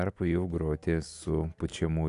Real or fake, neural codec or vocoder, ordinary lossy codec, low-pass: real; none; AAC, 96 kbps; 10.8 kHz